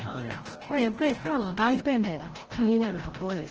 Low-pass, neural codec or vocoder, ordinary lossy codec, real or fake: 7.2 kHz; codec, 16 kHz, 0.5 kbps, FreqCodec, larger model; Opus, 16 kbps; fake